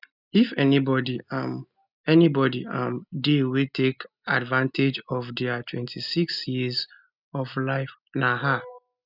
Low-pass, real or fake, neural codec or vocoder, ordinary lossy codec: 5.4 kHz; real; none; none